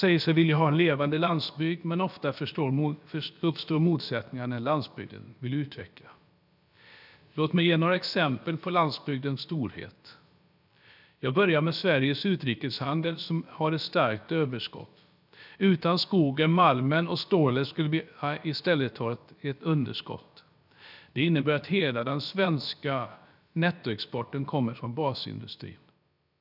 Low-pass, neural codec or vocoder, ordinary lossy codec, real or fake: 5.4 kHz; codec, 16 kHz, about 1 kbps, DyCAST, with the encoder's durations; none; fake